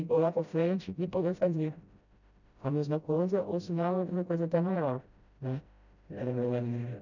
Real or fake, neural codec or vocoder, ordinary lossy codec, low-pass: fake; codec, 16 kHz, 0.5 kbps, FreqCodec, smaller model; none; 7.2 kHz